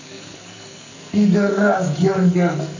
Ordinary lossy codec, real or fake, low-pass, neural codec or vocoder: none; fake; 7.2 kHz; codec, 44.1 kHz, 2.6 kbps, SNAC